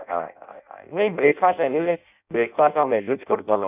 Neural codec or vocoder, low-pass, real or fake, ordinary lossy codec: codec, 16 kHz in and 24 kHz out, 0.6 kbps, FireRedTTS-2 codec; 3.6 kHz; fake; none